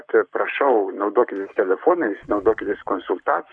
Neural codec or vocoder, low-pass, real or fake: codec, 44.1 kHz, 7.8 kbps, Pupu-Codec; 9.9 kHz; fake